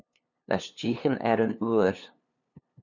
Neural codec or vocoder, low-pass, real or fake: codec, 16 kHz, 2 kbps, FunCodec, trained on LibriTTS, 25 frames a second; 7.2 kHz; fake